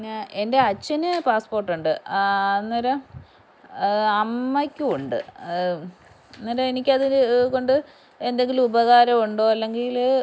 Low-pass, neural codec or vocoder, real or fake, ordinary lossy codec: none; none; real; none